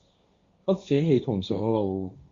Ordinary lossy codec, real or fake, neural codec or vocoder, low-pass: Opus, 64 kbps; fake; codec, 16 kHz, 1.1 kbps, Voila-Tokenizer; 7.2 kHz